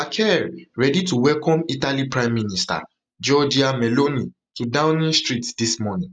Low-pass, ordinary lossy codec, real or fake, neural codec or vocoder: 9.9 kHz; none; real; none